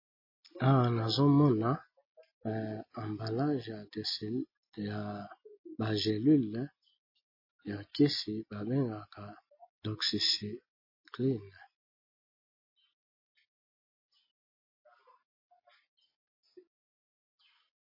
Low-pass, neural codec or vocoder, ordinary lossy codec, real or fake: 5.4 kHz; none; MP3, 24 kbps; real